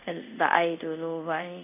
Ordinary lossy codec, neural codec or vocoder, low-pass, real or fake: none; codec, 24 kHz, 0.5 kbps, DualCodec; 3.6 kHz; fake